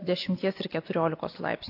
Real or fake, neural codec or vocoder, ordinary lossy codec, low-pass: real; none; AAC, 32 kbps; 5.4 kHz